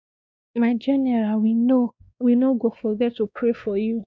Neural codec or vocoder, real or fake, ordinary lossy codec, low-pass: codec, 16 kHz, 2 kbps, X-Codec, WavLM features, trained on Multilingual LibriSpeech; fake; none; none